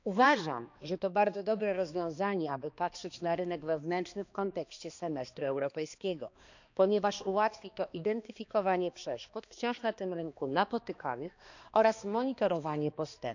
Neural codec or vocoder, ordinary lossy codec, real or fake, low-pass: codec, 16 kHz, 2 kbps, X-Codec, HuBERT features, trained on balanced general audio; none; fake; 7.2 kHz